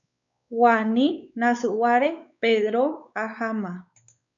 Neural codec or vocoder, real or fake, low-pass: codec, 16 kHz, 4 kbps, X-Codec, WavLM features, trained on Multilingual LibriSpeech; fake; 7.2 kHz